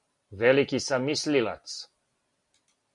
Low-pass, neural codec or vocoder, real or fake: 10.8 kHz; none; real